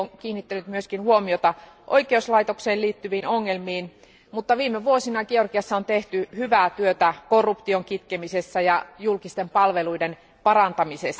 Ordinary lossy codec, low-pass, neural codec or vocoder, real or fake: none; none; none; real